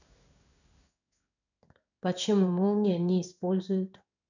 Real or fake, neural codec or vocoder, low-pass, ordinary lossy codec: fake; codec, 16 kHz in and 24 kHz out, 1 kbps, XY-Tokenizer; 7.2 kHz; none